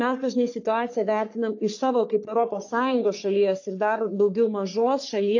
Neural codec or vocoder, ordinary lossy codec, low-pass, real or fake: codec, 44.1 kHz, 3.4 kbps, Pupu-Codec; AAC, 48 kbps; 7.2 kHz; fake